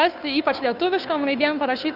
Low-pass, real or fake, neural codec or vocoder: 5.4 kHz; fake; codec, 16 kHz, 2 kbps, FunCodec, trained on Chinese and English, 25 frames a second